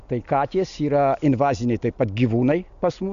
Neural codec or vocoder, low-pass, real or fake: none; 7.2 kHz; real